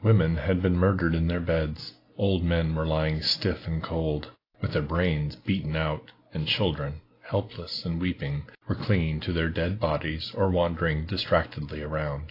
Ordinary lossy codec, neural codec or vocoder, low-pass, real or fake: AAC, 32 kbps; none; 5.4 kHz; real